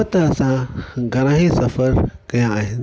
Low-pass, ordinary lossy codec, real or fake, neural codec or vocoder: none; none; real; none